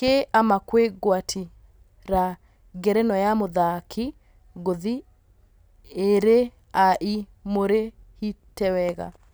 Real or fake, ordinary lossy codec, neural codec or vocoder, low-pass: real; none; none; none